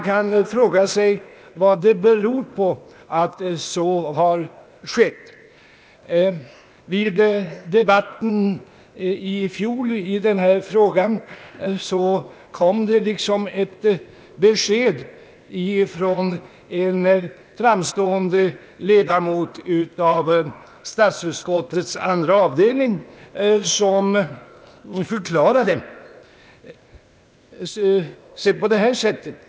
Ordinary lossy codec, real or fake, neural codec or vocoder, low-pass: none; fake; codec, 16 kHz, 0.8 kbps, ZipCodec; none